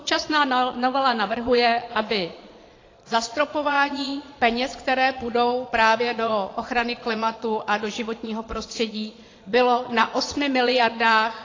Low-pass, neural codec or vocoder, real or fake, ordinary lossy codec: 7.2 kHz; vocoder, 22.05 kHz, 80 mel bands, Vocos; fake; AAC, 32 kbps